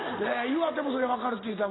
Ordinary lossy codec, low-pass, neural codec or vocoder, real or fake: AAC, 16 kbps; 7.2 kHz; none; real